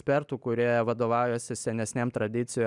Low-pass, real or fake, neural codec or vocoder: 10.8 kHz; real; none